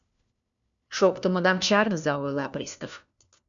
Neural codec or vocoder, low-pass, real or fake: codec, 16 kHz, 1 kbps, FunCodec, trained on LibriTTS, 50 frames a second; 7.2 kHz; fake